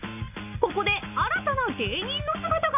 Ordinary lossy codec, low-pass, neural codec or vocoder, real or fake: none; 3.6 kHz; none; real